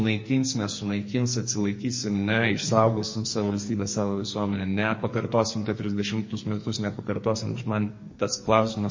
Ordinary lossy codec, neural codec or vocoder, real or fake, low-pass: MP3, 32 kbps; codec, 44.1 kHz, 2.6 kbps, SNAC; fake; 7.2 kHz